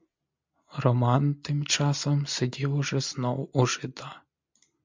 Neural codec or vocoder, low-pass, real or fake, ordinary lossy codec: none; 7.2 kHz; real; MP3, 64 kbps